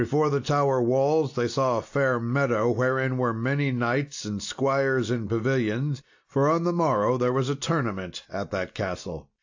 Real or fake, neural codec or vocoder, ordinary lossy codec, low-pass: real; none; AAC, 48 kbps; 7.2 kHz